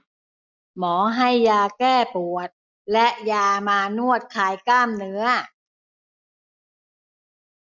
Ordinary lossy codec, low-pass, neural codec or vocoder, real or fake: none; 7.2 kHz; none; real